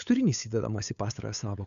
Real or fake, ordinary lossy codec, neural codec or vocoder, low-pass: fake; MP3, 96 kbps; codec, 16 kHz, 8 kbps, FunCodec, trained on LibriTTS, 25 frames a second; 7.2 kHz